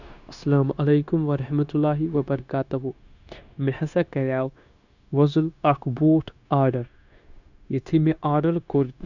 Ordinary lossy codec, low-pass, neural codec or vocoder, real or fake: none; 7.2 kHz; codec, 16 kHz, 0.9 kbps, LongCat-Audio-Codec; fake